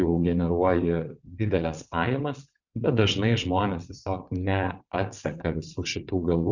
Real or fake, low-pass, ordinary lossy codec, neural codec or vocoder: fake; 7.2 kHz; Opus, 64 kbps; vocoder, 22.05 kHz, 80 mel bands, WaveNeXt